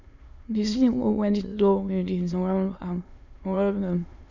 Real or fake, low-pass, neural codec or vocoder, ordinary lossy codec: fake; 7.2 kHz; autoencoder, 22.05 kHz, a latent of 192 numbers a frame, VITS, trained on many speakers; none